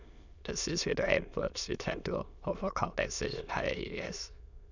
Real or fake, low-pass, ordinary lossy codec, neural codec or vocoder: fake; 7.2 kHz; none; autoencoder, 22.05 kHz, a latent of 192 numbers a frame, VITS, trained on many speakers